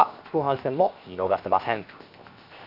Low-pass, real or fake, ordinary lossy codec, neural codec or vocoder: 5.4 kHz; fake; none; codec, 16 kHz, 0.7 kbps, FocalCodec